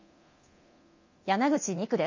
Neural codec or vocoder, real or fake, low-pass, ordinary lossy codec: codec, 24 kHz, 0.9 kbps, DualCodec; fake; 7.2 kHz; MP3, 32 kbps